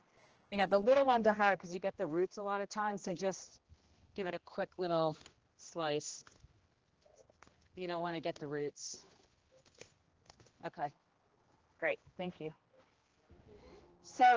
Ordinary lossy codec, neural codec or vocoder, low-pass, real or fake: Opus, 16 kbps; codec, 16 kHz, 1 kbps, X-Codec, HuBERT features, trained on general audio; 7.2 kHz; fake